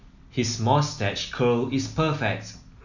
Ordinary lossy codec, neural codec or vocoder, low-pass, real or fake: none; none; 7.2 kHz; real